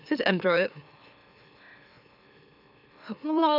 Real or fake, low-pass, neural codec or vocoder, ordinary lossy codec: fake; 5.4 kHz; autoencoder, 44.1 kHz, a latent of 192 numbers a frame, MeloTTS; none